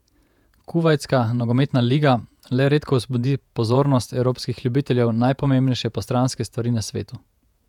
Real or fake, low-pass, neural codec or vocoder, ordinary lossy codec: fake; 19.8 kHz; vocoder, 48 kHz, 128 mel bands, Vocos; none